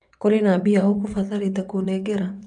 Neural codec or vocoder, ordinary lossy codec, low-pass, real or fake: vocoder, 22.05 kHz, 80 mel bands, WaveNeXt; none; 9.9 kHz; fake